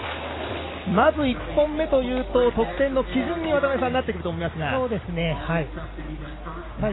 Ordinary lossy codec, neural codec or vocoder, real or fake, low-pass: AAC, 16 kbps; none; real; 7.2 kHz